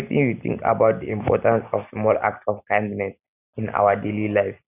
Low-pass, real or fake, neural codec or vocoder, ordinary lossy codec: 3.6 kHz; real; none; none